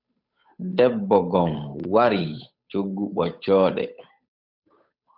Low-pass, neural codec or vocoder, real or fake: 5.4 kHz; codec, 16 kHz, 8 kbps, FunCodec, trained on Chinese and English, 25 frames a second; fake